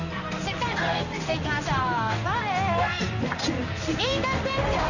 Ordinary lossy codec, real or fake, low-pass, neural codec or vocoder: none; fake; 7.2 kHz; codec, 16 kHz in and 24 kHz out, 1 kbps, XY-Tokenizer